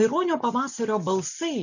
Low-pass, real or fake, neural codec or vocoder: 7.2 kHz; real; none